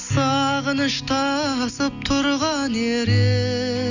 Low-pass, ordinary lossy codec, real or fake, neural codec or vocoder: 7.2 kHz; none; real; none